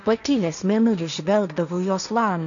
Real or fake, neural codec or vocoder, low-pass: fake; codec, 16 kHz, 1.1 kbps, Voila-Tokenizer; 7.2 kHz